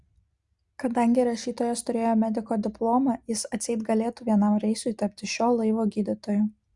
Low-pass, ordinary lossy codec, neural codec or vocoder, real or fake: 10.8 kHz; Opus, 64 kbps; none; real